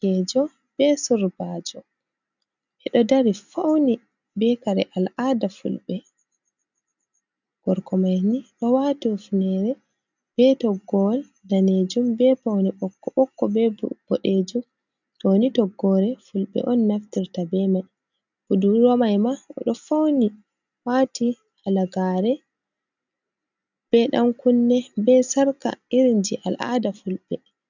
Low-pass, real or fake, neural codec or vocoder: 7.2 kHz; real; none